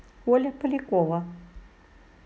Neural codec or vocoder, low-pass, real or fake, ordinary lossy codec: none; none; real; none